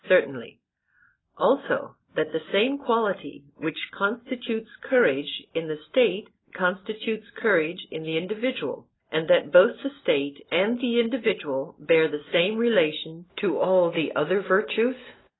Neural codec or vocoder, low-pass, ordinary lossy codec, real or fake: none; 7.2 kHz; AAC, 16 kbps; real